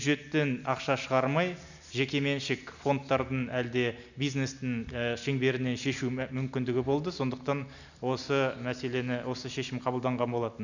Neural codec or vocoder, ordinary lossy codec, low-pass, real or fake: none; none; 7.2 kHz; real